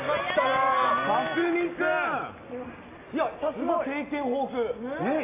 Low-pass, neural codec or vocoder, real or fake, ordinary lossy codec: 3.6 kHz; none; real; AAC, 24 kbps